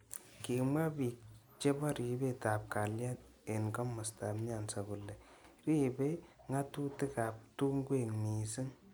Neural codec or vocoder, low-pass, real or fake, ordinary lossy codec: none; none; real; none